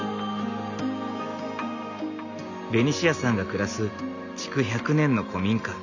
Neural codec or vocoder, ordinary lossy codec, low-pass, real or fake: none; none; 7.2 kHz; real